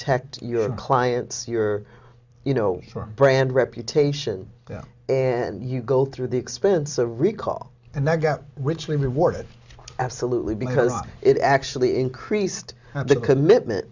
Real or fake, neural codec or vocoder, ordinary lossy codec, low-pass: real; none; Opus, 64 kbps; 7.2 kHz